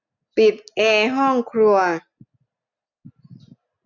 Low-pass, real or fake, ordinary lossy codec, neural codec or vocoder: 7.2 kHz; real; none; none